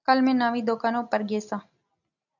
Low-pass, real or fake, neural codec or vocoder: 7.2 kHz; real; none